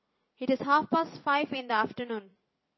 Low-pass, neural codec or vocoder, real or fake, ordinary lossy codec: 7.2 kHz; vocoder, 44.1 kHz, 80 mel bands, Vocos; fake; MP3, 24 kbps